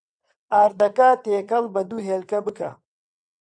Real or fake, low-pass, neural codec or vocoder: fake; 9.9 kHz; vocoder, 22.05 kHz, 80 mel bands, WaveNeXt